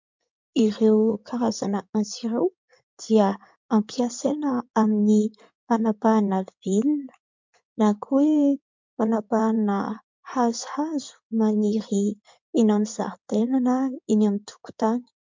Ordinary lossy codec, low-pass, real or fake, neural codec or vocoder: MP3, 64 kbps; 7.2 kHz; fake; codec, 16 kHz in and 24 kHz out, 2.2 kbps, FireRedTTS-2 codec